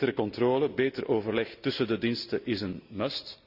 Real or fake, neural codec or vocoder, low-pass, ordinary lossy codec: real; none; 5.4 kHz; none